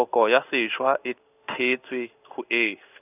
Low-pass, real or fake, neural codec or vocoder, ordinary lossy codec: 3.6 kHz; fake; codec, 16 kHz in and 24 kHz out, 1 kbps, XY-Tokenizer; none